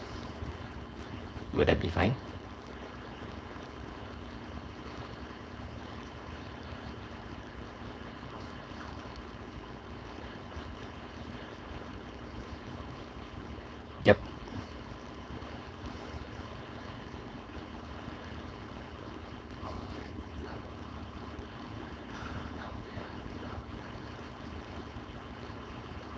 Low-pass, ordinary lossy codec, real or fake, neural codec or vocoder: none; none; fake; codec, 16 kHz, 4.8 kbps, FACodec